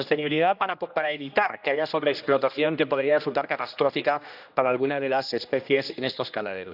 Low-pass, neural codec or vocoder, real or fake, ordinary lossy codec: 5.4 kHz; codec, 16 kHz, 1 kbps, X-Codec, HuBERT features, trained on general audio; fake; none